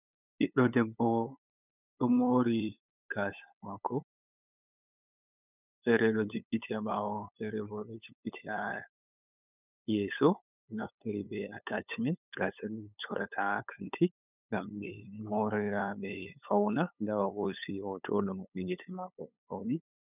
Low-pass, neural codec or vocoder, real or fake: 3.6 kHz; codec, 16 kHz, 8 kbps, FunCodec, trained on LibriTTS, 25 frames a second; fake